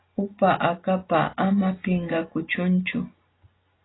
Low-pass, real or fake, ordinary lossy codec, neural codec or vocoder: 7.2 kHz; real; AAC, 16 kbps; none